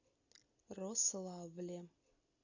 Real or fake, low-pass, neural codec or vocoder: real; 7.2 kHz; none